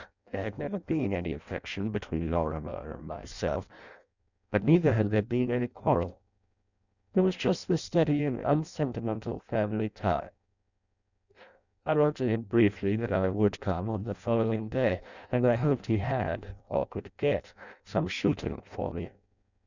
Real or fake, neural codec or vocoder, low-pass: fake; codec, 16 kHz in and 24 kHz out, 0.6 kbps, FireRedTTS-2 codec; 7.2 kHz